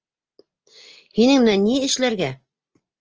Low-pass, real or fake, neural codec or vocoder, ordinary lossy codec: 7.2 kHz; real; none; Opus, 24 kbps